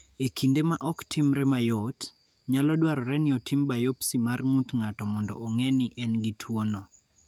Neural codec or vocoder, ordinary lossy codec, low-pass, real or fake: codec, 44.1 kHz, 7.8 kbps, DAC; none; 19.8 kHz; fake